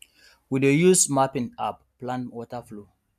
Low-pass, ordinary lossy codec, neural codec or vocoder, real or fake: 14.4 kHz; MP3, 96 kbps; none; real